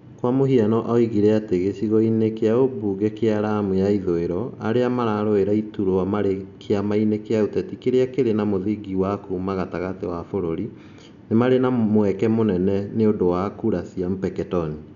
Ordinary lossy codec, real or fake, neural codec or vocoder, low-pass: none; real; none; 7.2 kHz